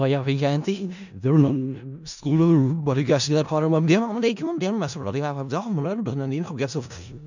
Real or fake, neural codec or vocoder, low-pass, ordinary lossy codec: fake; codec, 16 kHz in and 24 kHz out, 0.4 kbps, LongCat-Audio-Codec, four codebook decoder; 7.2 kHz; none